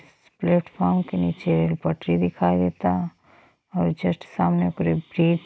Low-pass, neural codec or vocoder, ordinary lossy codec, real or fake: none; none; none; real